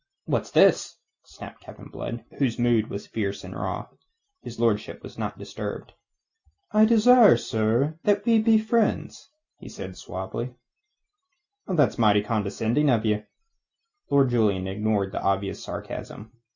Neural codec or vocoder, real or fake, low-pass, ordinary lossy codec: none; real; 7.2 kHz; Opus, 64 kbps